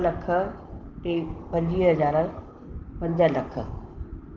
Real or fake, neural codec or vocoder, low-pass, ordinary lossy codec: real; none; 7.2 kHz; Opus, 16 kbps